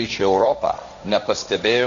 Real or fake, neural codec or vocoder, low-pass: fake; codec, 16 kHz, 1.1 kbps, Voila-Tokenizer; 7.2 kHz